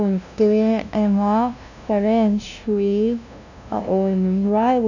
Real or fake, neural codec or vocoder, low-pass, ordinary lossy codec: fake; codec, 16 kHz, 0.5 kbps, FunCodec, trained on LibriTTS, 25 frames a second; 7.2 kHz; none